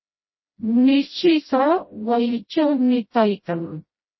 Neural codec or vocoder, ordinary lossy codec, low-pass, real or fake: codec, 16 kHz, 0.5 kbps, FreqCodec, smaller model; MP3, 24 kbps; 7.2 kHz; fake